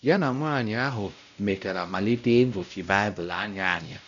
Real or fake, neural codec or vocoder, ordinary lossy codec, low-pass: fake; codec, 16 kHz, 0.5 kbps, X-Codec, WavLM features, trained on Multilingual LibriSpeech; MP3, 96 kbps; 7.2 kHz